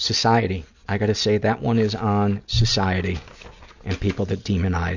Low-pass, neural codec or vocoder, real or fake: 7.2 kHz; none; real